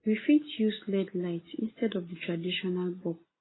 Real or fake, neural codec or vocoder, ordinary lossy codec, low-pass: real; none; AAC, 16 kbps; 7.2 kHz